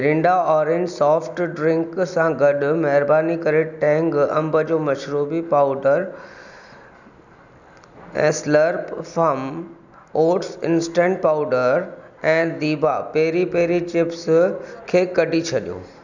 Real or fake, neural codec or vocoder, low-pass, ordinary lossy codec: real; none; 7.2 kHz; none